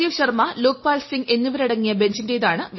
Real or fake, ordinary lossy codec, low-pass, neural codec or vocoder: real; MP3, 24 kbps; 7.2 kHz; none